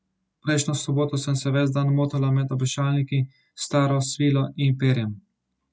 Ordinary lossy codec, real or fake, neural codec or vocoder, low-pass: none; real; none; none